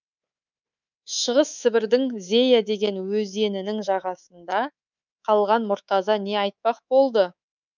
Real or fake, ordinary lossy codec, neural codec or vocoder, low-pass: fake; none; codec, 24 kHz, 3.1 kbps, DualCodec; 7.2 kHz